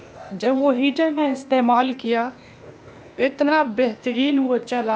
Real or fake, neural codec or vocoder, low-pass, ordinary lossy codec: fake; codec, 16 kHz, 0.8 kbps, ZipCodec; none; none